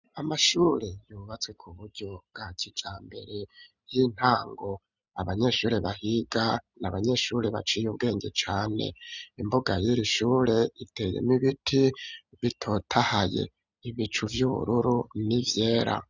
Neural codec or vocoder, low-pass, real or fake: none; 7.2 kHz; real